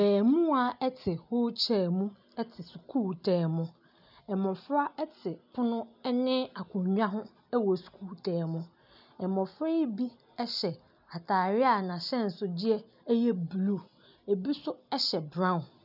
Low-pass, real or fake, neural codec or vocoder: 5.4 kHz; real; none